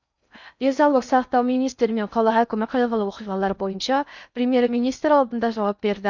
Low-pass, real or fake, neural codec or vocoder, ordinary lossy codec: 7.2 kHz; fake; codec, 16 kHz in and 24 kHz out, 0.6 kbps, FocalCodec, streaming, 4096 codes; none